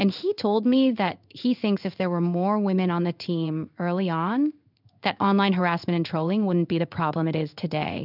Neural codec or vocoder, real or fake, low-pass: codec, 16 kHz in and 24 kHz out, 1 kbps, XY-Tokenizer; fake; 5.4 kHz